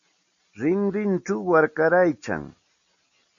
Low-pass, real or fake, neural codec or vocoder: 7.2 kHz; real; none